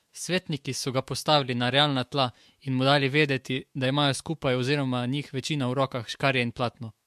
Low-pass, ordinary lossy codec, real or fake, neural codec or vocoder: 14.4 kHz; MP3, 64 kbps; fake; autoencoder, 48 kHz, 128 numbers a frame, DAC-VAE, trained on Japanese speech